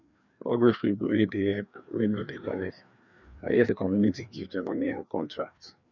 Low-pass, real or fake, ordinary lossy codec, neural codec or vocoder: 7.2 kHz; fake; none; codec, 16 kHz, 2 kbps, FreqCodec, larger model